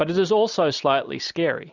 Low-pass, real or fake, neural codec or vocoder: 7.2 kHz; real; none